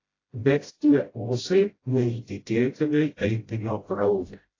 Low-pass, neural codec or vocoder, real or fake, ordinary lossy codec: 7.2 kHz; codec, 16 kHz, 0.5 kbps, FreqCodec, smaller model; fake; AAC, 32 kbps